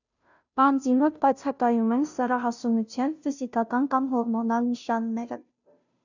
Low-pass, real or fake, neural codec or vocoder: 7.2 kHz; fake; codec, 16 kHz, 0.5 kbps, FunCodec, trained on Chinese and English, 25 frames a second